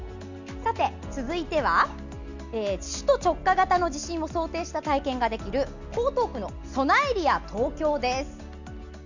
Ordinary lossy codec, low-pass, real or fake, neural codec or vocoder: none; 7.2 kHz; real; none